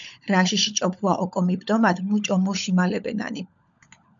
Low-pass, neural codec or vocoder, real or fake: 7.2 kHz; codec, 16 kHz, 16 kbps, FunCodec, trained on LibriTTS, 50 frames a second; fake